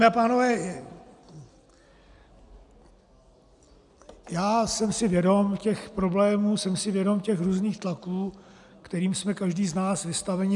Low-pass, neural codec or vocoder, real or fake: 10.8 kHz; none; real